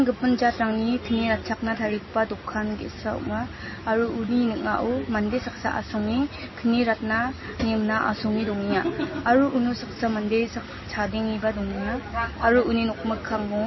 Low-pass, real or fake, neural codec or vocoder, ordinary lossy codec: 7.2 kHz; real; none; MP3, 24 kbps